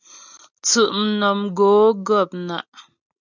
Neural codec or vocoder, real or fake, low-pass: none; real; 7.2 kHz